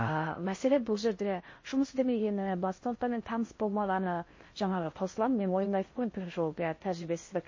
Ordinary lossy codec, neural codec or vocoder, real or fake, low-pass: MP3, 32 kbps; codec, 16 kHz in and 24 kHz out, 0.6 kbps, FocalCodec, streaming, 4096 codes; fake; 7.2 kHz